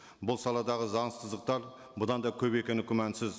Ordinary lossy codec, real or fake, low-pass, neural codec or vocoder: none; real; none; none